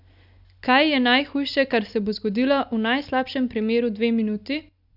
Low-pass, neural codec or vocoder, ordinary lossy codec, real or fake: 5.4 kHz; none; none; real